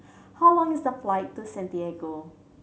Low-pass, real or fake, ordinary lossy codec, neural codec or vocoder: none; real; none; none